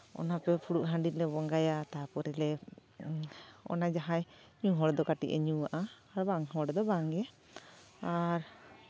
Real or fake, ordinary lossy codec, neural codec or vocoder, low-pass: real; none; none; none